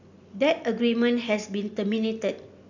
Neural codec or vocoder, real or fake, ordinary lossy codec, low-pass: none; real; none; 7.2 kHz